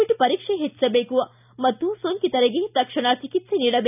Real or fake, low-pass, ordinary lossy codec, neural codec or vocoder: real; 3.6 kHz; none; none